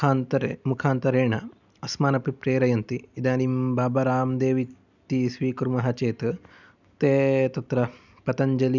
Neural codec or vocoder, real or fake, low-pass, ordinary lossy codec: none; real; 7.2 kHz; none